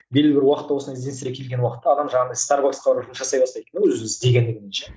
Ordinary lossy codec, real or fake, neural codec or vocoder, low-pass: none; real; none; none